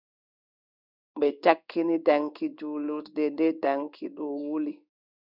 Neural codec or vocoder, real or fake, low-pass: codec, 16 kHz in and 24 kHz out, 1 kbps, XY-Tokenizer; fake; 5.4 kHz